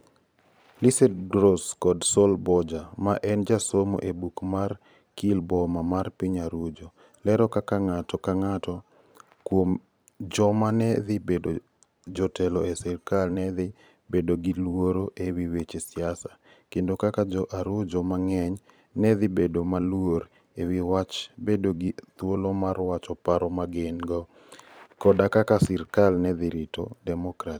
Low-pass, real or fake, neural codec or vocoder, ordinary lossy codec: none; fake; vocoder, 44.1 kHz, 128 mel bands every 512 samples, BigVGAN v2; none